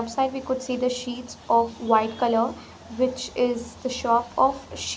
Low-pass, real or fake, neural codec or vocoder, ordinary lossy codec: none; real; none; none